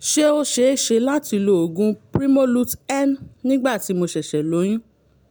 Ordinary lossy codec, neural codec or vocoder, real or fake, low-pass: none; none; real; none